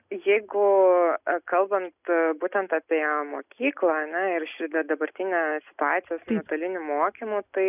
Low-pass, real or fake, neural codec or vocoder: 3.6 kHz; real; none